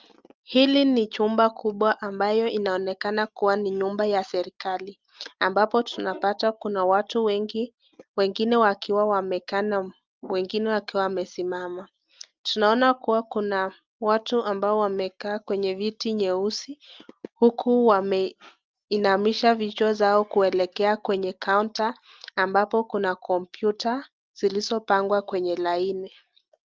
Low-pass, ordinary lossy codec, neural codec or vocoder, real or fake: 7.2 kHz; Opus, 32 kbps; none; real